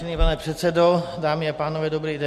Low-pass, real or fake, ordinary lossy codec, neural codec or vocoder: 14.4 kHz; real; MP3, 64 kbps; none